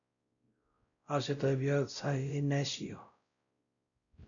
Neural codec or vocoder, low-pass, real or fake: codec, 16 kHz, 0.5 kbps, X-Codec, WavLM features, trained on Multilingual LibriSpeech; 7.2 kHz; fake